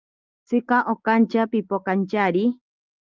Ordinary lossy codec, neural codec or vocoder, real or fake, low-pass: Opus, 24 kbps; none; real; 7.2 kHz